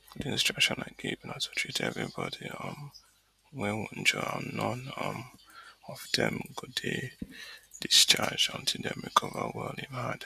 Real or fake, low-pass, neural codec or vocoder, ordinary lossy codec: real; 14.4 kHz; none; none